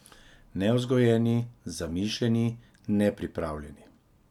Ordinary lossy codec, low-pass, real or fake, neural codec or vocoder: none; 19.8 kHz; real; none